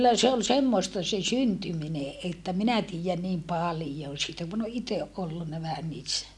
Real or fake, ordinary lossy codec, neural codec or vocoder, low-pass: real; none; none; none